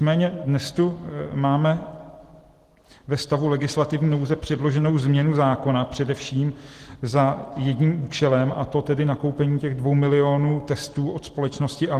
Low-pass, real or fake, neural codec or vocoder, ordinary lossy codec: 14.4 kHz; real; none; Opus, 16 kbps